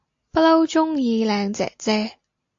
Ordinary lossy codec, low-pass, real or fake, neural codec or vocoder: AAC, 64 kbps; 7.2 kHz; real; none